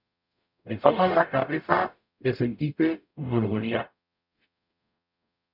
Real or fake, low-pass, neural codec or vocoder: fake; 5.4 kHz; codec, 44.1 kHz, 0.9 kbps, DAC